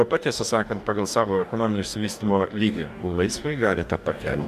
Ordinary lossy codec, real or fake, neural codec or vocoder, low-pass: MP3, 96 kbps; fake; codec, 44.1 kHz, 2.6 kbps, DAC; 14.4 kHz